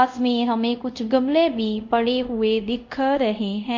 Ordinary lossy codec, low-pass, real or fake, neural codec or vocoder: MP3, 48 kbps; 7.2 kHz; fake; codec, 24 kHz, 0.5 kbps, DualCodec